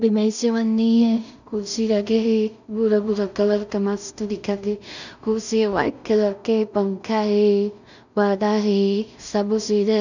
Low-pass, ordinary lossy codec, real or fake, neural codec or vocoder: 7.2 kHz; none; fake; codec, 16 kHz in and 24 kHz out, 0.4 kbps, LongCat-Audio-Codec, two codebook decoder